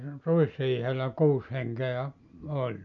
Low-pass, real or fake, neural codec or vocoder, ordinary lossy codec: 7.2 kHz; real; none; none